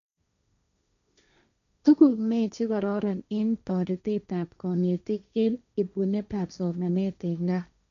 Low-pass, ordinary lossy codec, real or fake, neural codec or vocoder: 7.2 kHz; none; fake; codec, 16 kHz, 1.1 kbps, Voila-Tokenizer